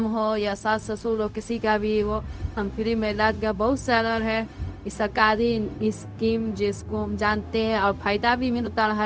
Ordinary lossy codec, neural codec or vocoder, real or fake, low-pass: none; codec, 16 kHz, 0.4 kbps, LongCat-Audio-Codec; fake; none